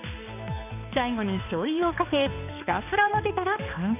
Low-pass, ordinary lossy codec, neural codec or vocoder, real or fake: 3.6 kHz; none; codec, 16 kHz, 2 kbps, X-Codec, HuBERT features, trained on balanced general audio; fake